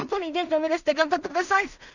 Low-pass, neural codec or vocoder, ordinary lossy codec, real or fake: 7.2 kHz; codec, 16 kHz in and 24 kHz out, 0.4 kbps, LongCat-Audio-Codec, two codebook decoder; none; fake